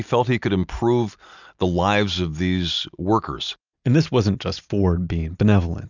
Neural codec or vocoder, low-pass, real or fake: none; 7.2 kHz; real